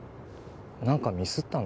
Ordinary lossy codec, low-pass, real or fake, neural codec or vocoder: none; none; real; none